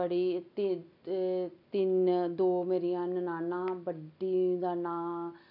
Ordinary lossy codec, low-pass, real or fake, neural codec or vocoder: none; 5.4 kHz; real; none